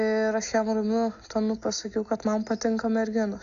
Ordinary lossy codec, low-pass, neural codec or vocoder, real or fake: Opus, 64 kbps; 7.2 kHz; none; real